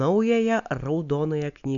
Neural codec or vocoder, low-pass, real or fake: none; 7.2 kHz; real